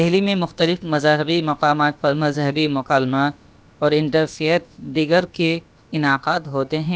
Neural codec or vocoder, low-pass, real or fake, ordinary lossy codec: codec, 16 kHz, about 1 kbps, DyCAST, with the encoder's durations; none; fake; none